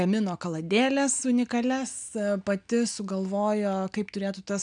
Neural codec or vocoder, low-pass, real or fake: none; 9.9 kHz; real